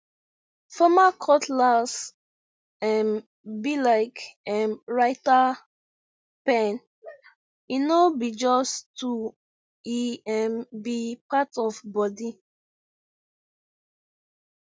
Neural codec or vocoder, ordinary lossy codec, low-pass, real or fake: none; none; none; real